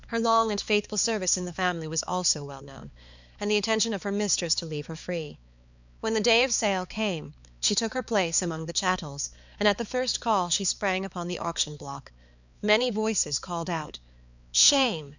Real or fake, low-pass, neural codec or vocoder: fake; 7.2 kHz; codec, 16 kHz, 4 kbps, X-Codec, HuBERT features, trained on balanced general audio